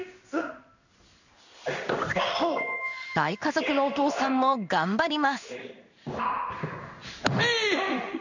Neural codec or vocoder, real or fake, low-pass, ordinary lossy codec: codec, 16 kHz in and 24 kHz out, 1 kbps, XY-Tokenizer; fake; 7.2 kHz; none